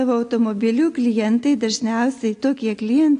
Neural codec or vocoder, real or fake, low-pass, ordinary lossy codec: none; real; 9.9 kHz; AAC, 48 kbps